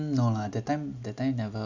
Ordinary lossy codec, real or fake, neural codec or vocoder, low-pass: none; real; none; 7.2 kHz